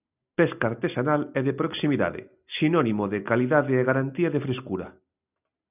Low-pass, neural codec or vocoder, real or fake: 3.6 kHz; none; real